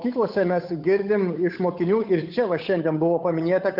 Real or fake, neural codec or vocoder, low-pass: fake; codec, 16 kHz, 8 kbps, FunCodec, trained on Chinese and English, 25 frames a second; 5.4 kHz